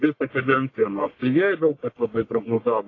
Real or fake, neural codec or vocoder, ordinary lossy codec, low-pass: fake; codec, 44.1 kHz, 1.7 kbps, Pupu-Codec; AAC, 32 kbps; 7.2 kHz